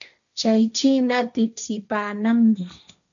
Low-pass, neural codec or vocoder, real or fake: 7.2 kHz; codec, 16 kHz, 1.1 kbps, Voila-Tokenizer; fake